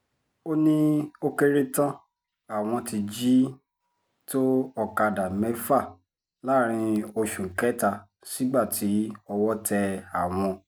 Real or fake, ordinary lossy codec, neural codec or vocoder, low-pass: real; none; none; none